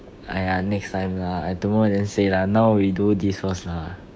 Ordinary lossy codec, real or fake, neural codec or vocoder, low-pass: none; fake; codec, 16 kHz, 6 kbps, DAC; none